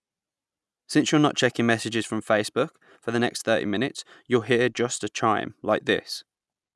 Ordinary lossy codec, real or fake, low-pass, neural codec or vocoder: none; real; none; none